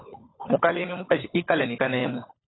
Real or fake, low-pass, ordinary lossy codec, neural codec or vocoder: fake; 7.2 kHz; AAC, 16 kbps; codec, 16 kHz, 16 kbps, FunCodec, trained on LibriTTS, 50 frames a second